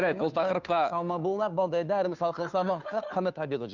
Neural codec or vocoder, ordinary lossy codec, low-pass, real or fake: codec, 16 kHz, 2 kbps, FunCodec, trained on Chinese and English, 25 frames a second; none; 7.2 kHz; fake